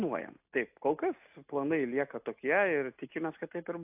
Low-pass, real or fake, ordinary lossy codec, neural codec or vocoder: 3.6 kHz; real; AAC, 32 kbps; none